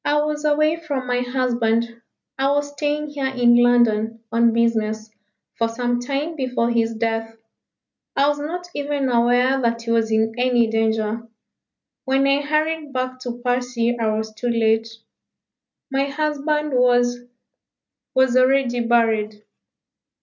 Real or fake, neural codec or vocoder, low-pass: real; none; 7.2 kHz